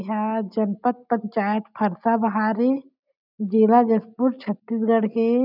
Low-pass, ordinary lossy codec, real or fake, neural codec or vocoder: 5.4 kHz; none; real; none